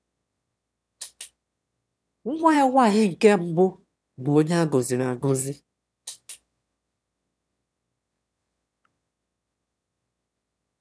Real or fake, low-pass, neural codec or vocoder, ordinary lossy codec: fake; none; autoencoder, 22.05 kHz, a latent of 192 numbers a frame, VITS, trained on one speaker; none